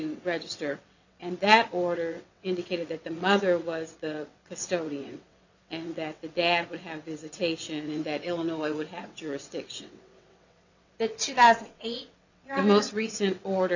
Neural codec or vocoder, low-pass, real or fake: vocoder, 22.05 kHz, 80 mel bands, WaveNeXt; 7.2 kHz; fake